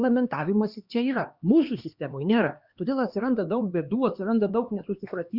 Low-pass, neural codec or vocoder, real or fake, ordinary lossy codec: 5.4 kHz; codec, 16 kHz, 2 kbps, X-Codec, HuBERT features, trained on LibriSpeech; fake; Opus, 64 kbps